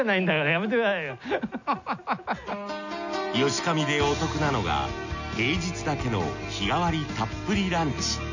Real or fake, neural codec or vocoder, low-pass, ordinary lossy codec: real; none; 7.2 kHz; none